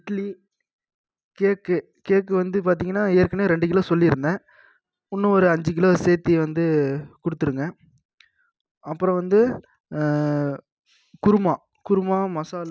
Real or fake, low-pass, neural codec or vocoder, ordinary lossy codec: real; none; none; none